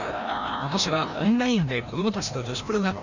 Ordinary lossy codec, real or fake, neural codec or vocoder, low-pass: none; fake; codec, 16 kHz, 1 kbps, FreqCodec, larger model; 7.2 kHz